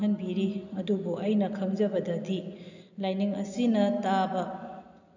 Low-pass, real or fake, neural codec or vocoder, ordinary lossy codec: 7.2 kHz; real; none; none